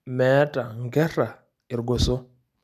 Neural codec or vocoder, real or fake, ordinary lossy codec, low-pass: none; real; none; 14.4 kHz